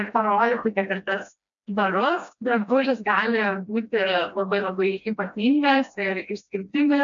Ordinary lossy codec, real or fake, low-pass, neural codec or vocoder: MP3, 64 kbps; fake; 7.2 kHz; codec, 16 kHz, 1 kbps, FreqCodec, smaller model